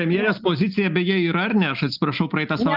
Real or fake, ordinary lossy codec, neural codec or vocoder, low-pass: real; Opus, 24 kbps; none; 5.4 kHz